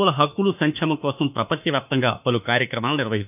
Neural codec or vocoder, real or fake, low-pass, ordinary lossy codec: autoencoder, 48 kHz, 32 numbers a frame, DAC-VAE, trained on Japanese speech; fake; 3.6 kHz; none